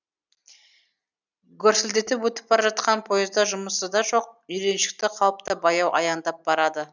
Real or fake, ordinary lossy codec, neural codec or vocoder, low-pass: real; none; none; 7.2 kHz